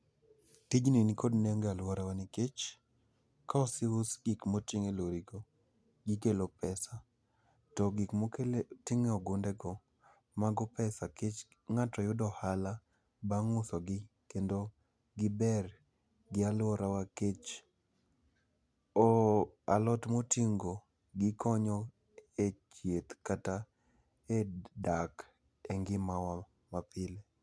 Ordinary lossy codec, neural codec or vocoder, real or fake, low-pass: none; none; real; none